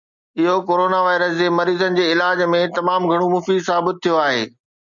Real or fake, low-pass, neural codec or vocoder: real; 7.2 kHz; none